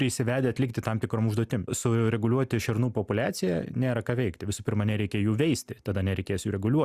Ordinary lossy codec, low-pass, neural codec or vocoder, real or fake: Opus, 64 kbps; 14.4 kHz; none; real